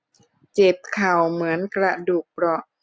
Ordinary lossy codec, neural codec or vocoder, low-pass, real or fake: none; none; none; real